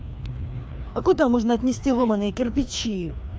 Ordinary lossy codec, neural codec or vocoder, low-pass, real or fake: none; codec, 16 kHz, 2 kbps, FreqCodec, larger model; none; fake